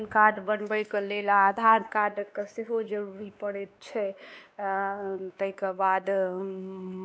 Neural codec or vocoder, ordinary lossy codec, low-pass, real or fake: codec, 16 kHz, 2 kbps, X-Codec, WavLM features, trained on Multilingual LibriSpeech; none; none; fake